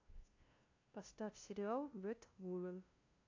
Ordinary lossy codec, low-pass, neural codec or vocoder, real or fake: AAC, 48 kbps; 7.2 kHz; codec, 16 kHz, 0.5 kbps, FunCodec, trained on LibriTTS, 25 frames a second; fake